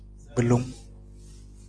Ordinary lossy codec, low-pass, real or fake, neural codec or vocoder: Opus, 24 kbps; 9.9 kHz; real; none